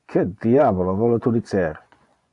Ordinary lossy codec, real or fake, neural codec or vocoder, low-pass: AAC, 64 kbps; fake; vocoder, 24 kHz, 100 mel bands, Vocos; 10.8 kHz